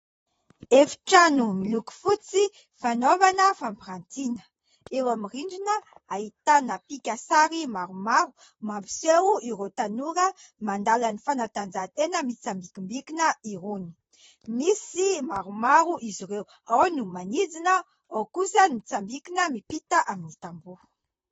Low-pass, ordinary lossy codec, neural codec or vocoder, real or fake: 19.8 kHz; AAC, 24 kbps; vocoder, 44.1 kHz, 128 mel bands, Pupu-Vocoder; fake